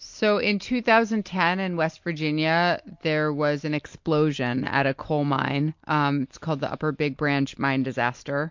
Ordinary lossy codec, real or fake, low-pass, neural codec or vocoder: MP3, 48 kbps; real; 7.2 kHz; none